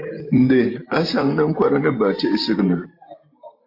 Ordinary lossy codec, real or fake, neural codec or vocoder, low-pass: AAC, 32 kbps; fake; vocoder, 44.1 kHz, 128 mel bands every 512 samples, BigVGAN v2; 5.4 kHz